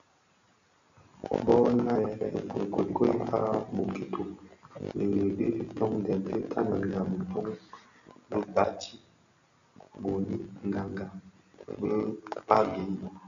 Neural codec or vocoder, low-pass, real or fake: none; 7.2 kHz; real